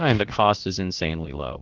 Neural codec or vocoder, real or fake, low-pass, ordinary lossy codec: codec, 16 kHz, about 1 kbps, DyCAST, with the encoder's durations; fake; 7.2 kHz; Opus, 16 kbps